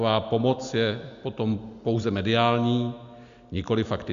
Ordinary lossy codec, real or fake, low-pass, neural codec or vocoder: Opus, 64 kbps; real; 7.2 kHz; none